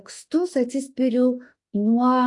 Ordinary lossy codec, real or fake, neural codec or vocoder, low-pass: AAC, 48 kbps; fake; autoencoder, 48 kHz, 32 numbers a frame, DAC-VAE, trained on Japanese speech; 10.8 kHz